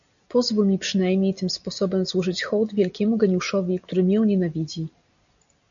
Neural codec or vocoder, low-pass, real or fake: none; 7.2 kHz; real